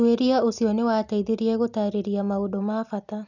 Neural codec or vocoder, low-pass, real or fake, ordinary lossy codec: none; 7.2 kHz; real; none